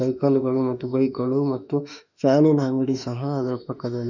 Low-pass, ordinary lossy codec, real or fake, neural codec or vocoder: 7.2 kHz; none; fake; autoencoder, 48 kHz, 32 numbers a frame, DAC-VAE, trained on Japanese speech